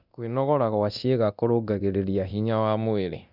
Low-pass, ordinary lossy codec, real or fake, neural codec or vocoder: 5.4 kHz; none; fake; codec, 24 kHz, 0.9 kbps, DualCodec